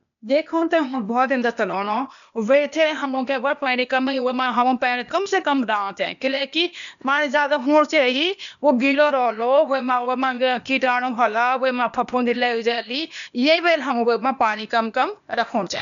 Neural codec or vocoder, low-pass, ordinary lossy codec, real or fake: codec, 16 kHz, 0.8 kbps, ZipCodec; 7.2 kHz; MP3, 96 kbps; fake